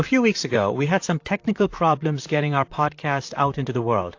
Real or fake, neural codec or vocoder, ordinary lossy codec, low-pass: fake; vocoder, 44.1 kHz, 128 mel bands, Pupu-Vocoder; AAC, 48 kbps; 7.2 kHz